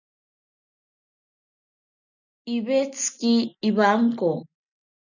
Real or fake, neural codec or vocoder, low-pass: real; none; 7.2 kHz